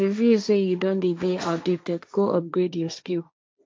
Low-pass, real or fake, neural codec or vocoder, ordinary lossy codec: none; fake; codec, 16 kHz, 1.1 kbps, Voila-Tokenizer; none